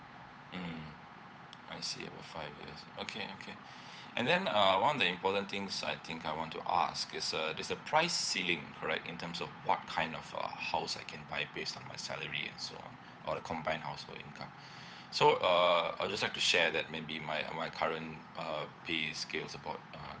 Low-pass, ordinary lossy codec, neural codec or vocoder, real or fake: none; none; codec, 16 kHz, 8 kbps, FunCodec, trained on Chinese and English, 25 frames a second; fake